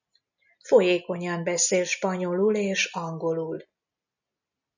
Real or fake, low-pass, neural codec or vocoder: real; 7.2 kHz; none